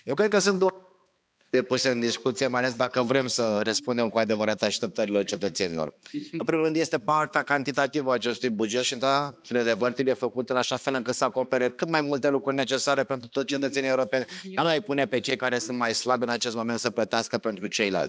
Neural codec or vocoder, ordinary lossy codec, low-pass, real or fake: codec, 16 kHz, 2 kbps, X-Codec, HuBERT features, trained on balanced general audio; none; none; fake